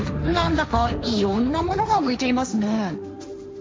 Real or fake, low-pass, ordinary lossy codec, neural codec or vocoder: fake; none; none; codec, 16 kHz, 1.1 kbps, Voila-Tokenizer